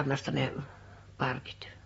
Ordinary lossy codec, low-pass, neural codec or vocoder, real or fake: AAC, 24 kbps; 19.8 kHz; none; real